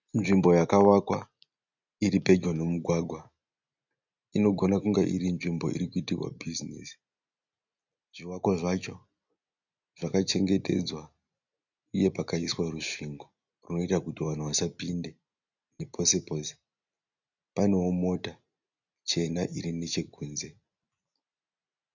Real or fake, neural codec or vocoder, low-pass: real; none; 7.2 kHz